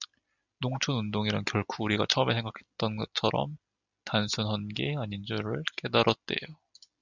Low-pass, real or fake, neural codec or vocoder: 7.2 kHz; real; none